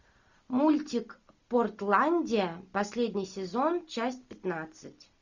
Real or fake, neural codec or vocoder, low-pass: real; none; 7.2 kHz